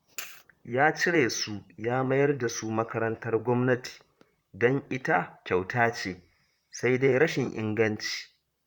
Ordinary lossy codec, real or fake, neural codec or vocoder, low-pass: Opus, 64 kbps; fake; vocoder, 44.1 kHz, 128 mel bands, Pupu-Vocoder; 19.8 kHz